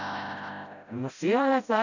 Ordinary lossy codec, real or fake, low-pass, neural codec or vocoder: none; fake; 7.2 kHz; codec, 16 kHz, 0.5 kbps, FreqCodec, smaller model